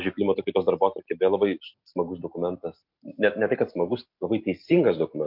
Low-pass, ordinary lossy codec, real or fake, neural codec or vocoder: 5.4 kHz; MP3, 48 kbps; real; none